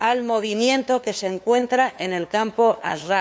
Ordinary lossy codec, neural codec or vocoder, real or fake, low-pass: none; codec, 16 kHz, 2 kbps, FunCodec, trained on LibriTTS, 25 frames a second; fake; none